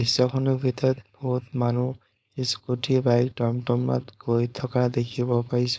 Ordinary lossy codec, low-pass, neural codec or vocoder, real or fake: none; none; codec, 16 kHz, 4.8 kbps, FACodec; fake